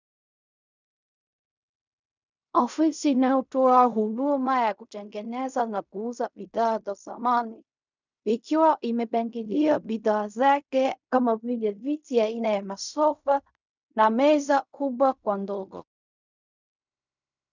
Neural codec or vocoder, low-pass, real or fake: codec, 16 kHz in and 24 kHz out, 0.4 kbps, LongCat-Audio-Codec, fine tuned four codebook decoder; 7.2 kHz; fake